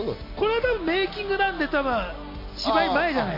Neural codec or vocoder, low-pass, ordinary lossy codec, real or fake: none; 5.4 kHz; MP3, 32 kbps; real